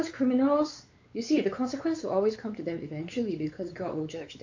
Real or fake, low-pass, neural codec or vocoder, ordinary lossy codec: fake; 7.2 kHz; codec, 16 kHz, 4 kbps, X-Codec, WavLM features, trained on Multilingual LibriSpeech; AAC, 48 kbps